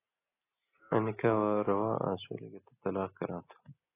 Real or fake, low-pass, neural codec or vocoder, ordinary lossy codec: real; 3.6 kHz; none; AAC, 16 kbps